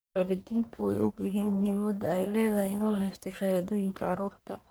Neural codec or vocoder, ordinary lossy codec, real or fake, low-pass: codec, 44.1 kHz, 1.7 kbps, Pupu-Codec; none; fake; none